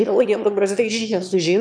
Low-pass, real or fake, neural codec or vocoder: 9.9 kHz; fake; autoencoder, 22.05 kHz, a latent of 192 numbers a frame, VITS, trained on one speaker